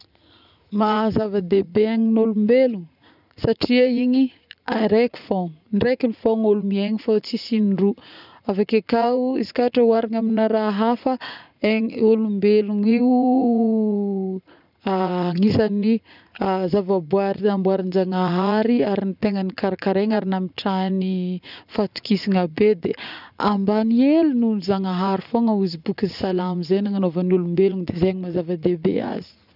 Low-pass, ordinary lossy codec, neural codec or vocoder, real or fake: 5.4 kHz; none; vocoder, 44.1 kHz, 80 mel bands, Vocos; fake